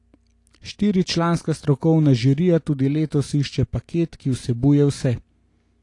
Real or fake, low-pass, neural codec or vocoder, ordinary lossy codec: real; 10.8 kHz; none; AAC, 48 kbps